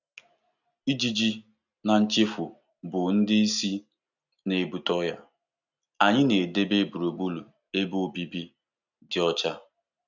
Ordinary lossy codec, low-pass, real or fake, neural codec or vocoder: none; 7.2 kHz; real; none